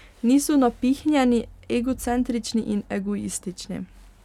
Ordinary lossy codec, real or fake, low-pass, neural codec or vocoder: none; real; 19.8 kHz; none